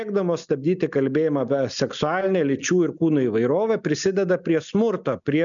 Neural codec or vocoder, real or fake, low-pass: none; real; 7.2 kHz